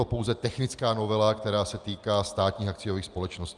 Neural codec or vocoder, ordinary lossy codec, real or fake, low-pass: none; Opus, 32 kbps; real; 10.8 kHz